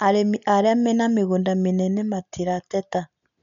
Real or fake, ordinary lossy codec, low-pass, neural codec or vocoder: real; none; 7.2 kHz; none